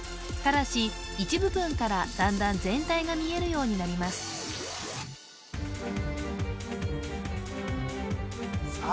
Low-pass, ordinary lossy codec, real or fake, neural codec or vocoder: none; none; real; none